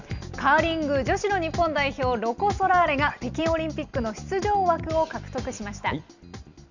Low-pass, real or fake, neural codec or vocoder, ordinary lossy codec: 7.2 kHz; real; none; none